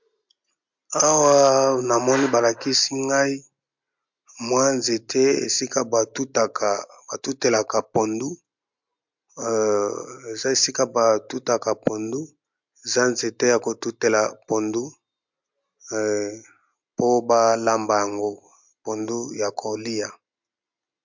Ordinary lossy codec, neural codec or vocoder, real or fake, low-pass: MP3, 64 kbps; none; real; 7.2 kHz